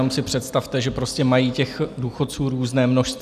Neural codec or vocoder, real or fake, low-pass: none; real; 14.4 kHz